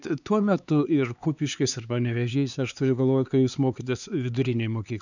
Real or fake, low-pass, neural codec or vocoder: fake; 7.2 kHz; codec, 16 kHz, 4 kbps, X-Codec, HuBERT features, trained on LibriSpeech